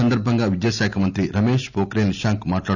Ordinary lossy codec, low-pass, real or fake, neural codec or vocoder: none; 7.2 kHz; real; none